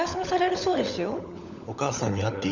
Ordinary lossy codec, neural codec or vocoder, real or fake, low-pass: none; codec, 16 kHz, 16 kbps, FunCodec, trained on LibriTTS, 50 frames a second; fake; 7.2 kHz